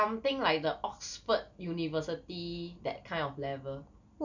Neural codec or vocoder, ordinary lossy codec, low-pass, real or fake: none; none; 7.2 kHz; real